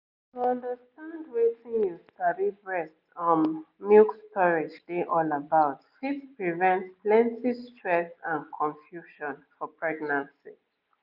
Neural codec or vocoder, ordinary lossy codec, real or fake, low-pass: none; none; real; 5.4 kHz